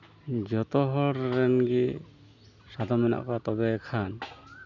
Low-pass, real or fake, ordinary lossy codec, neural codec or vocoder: 7.2 kHz; real; none; none